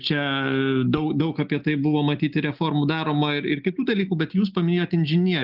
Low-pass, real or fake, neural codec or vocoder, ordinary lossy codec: 5.4 kHz; real; none; Opus, 24 kbps